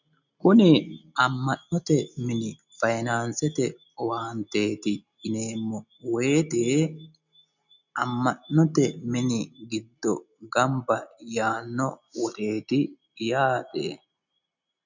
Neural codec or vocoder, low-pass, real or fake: none; 7.2 kHz; real